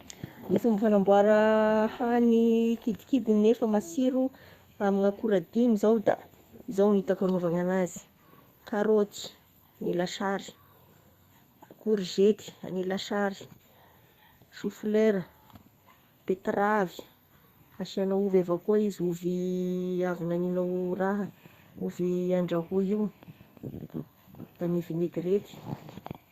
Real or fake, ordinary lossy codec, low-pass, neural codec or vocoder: fake; Opus, 64 kbps; 14.4 kHz; codec, 32 kHz, 1.9 kbps, SNAC